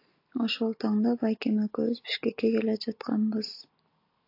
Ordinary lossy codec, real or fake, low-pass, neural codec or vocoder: AAC, 32 kbps; real; 5.4 kHz; none